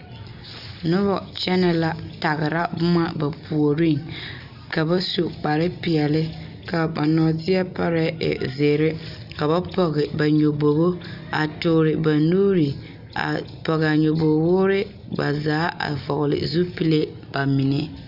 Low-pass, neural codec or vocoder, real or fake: 5.4 kHz; none; real